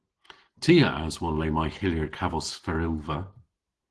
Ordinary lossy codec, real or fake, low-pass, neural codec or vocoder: Opus, 16 kbps; real; 10.8 kHz; none